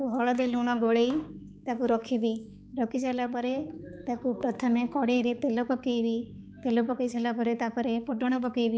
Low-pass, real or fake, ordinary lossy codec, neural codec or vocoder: none; fake; none; codec, 16 kHz, 4 kbps, X-Codec, HuBERT features, trained on balanced general audio